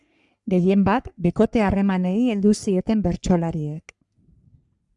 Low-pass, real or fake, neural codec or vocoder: 10.8 kHz; fake; codec, 44.1 kHz, 3.4 kbps, Pupu-Codec